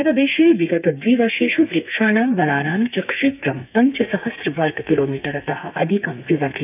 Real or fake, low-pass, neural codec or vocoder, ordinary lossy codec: fake; 3.6 kHz; codec, 32 kHz, 1.9 kbps, SNAC; none